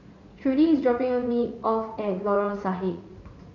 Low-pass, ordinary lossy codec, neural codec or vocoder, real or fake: 7.2 kHz; none; vocoder, 44.1 kHz, 80 mel bands, Vocos; fake